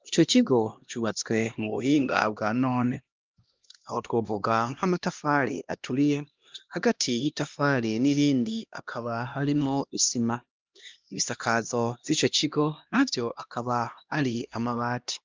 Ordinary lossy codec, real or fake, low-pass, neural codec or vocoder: Opus, 24 kbps; fake; 7.2 kHz; codec, 16 kHz, 1 kbps, X-Codec, HuBERT features, trained on LibriSpeech